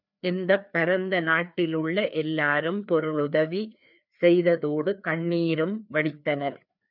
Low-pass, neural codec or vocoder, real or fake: 5.4 kHz; codec, 16 kHz, 2 kbps, FreqCodec, larger model; fake